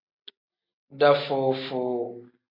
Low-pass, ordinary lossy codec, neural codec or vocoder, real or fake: 5.4 kHz; MP3, 32 kbps; none; real